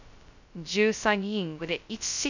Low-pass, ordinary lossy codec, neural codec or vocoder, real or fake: 7.2 kHz; none; codec, 16 kHz, 0.2 kbps, FocalCodec; fake